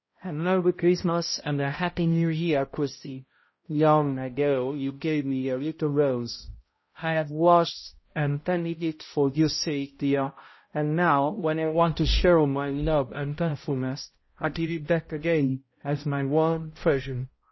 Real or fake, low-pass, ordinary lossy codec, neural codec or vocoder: fake; 7.2 kHz; MP3, 24 kbps; codec, 16 kHz, 0.5 kbps, X-Codec, HuBERT features, trained on balanced general audio